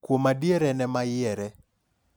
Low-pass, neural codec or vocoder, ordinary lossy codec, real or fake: none; none; none; real